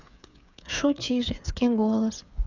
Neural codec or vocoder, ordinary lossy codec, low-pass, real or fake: codec, 16 kHz, 8 kbps, FreqCodec, smaller model; none; 7.2 kHz; fake